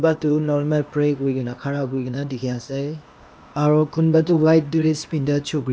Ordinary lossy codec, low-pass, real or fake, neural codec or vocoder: none; none; fake; codec, 16 kHz, 0.8 kbps, ZipCodec